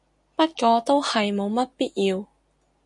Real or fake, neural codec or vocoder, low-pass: real; none; 10.8 kHz